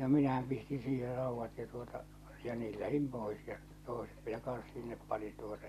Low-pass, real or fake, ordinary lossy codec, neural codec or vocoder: 14.4 kHz; real; MP3, 64 kbps; none